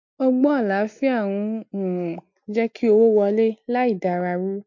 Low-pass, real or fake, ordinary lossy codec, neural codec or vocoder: 7.2 kHz; real; MP3, 48 kbps; none